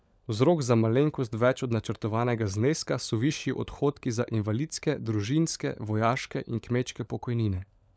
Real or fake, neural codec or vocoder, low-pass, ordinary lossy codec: fake; codec, 16 kHz, 16 kbps, FunCodec, trained on LibriTTS, 50 frames a second; none; none